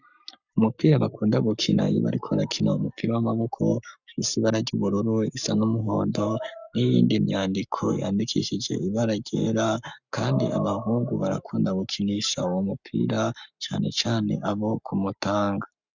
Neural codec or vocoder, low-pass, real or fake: codec, 44.1 kHz, 7.8 kbps, Pupu-Codec; 7.2 kHz; fake